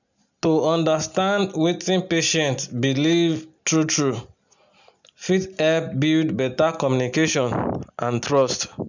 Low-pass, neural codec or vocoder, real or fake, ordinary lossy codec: 7.2 kHz; none; real; none